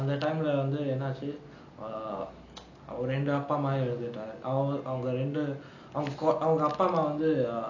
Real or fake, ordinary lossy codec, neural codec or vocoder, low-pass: real; none; none; 7.2 kHz